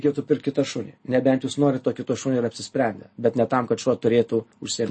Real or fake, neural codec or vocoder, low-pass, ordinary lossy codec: real; none; 9.9 kHz; MP3, 32 kbps